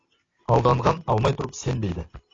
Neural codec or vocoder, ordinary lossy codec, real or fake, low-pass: none; AAC, 32 kbps; real; 7.2 kHz